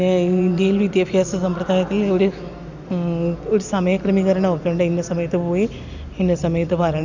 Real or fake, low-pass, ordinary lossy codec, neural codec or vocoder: real; 7.2 kHz; none; none